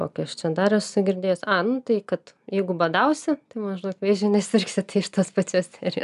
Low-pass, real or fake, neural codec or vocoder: 10.8 kHz; real; none